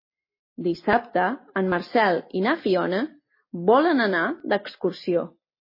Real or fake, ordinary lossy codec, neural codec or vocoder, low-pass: real; MP3, 24 kbps; none; 5.4 kHz